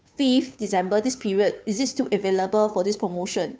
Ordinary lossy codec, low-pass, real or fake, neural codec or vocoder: none; none; fake; codec, 16 kHz, 2 kbps, FunCodec, trained on Chinese and English, 25 frames a second